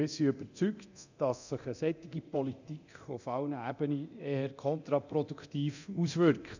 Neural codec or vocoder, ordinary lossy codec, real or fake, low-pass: codec, 24 kHz, 0.9 kbps, DualCodec; none; fake; 7.2 kHz